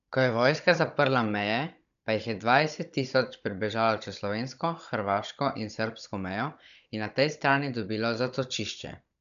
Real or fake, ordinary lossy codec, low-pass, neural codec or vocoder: fake; none; 7.2 kHz; codec, 16 kHz, 16 kbps, FunCodec, trained on Chinese and English, 50 frames a second